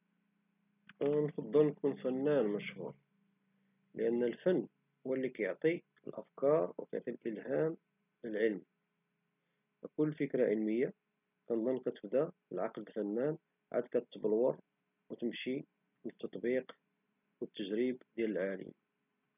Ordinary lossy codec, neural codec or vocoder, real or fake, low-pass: none; none; real; 3.6 kHz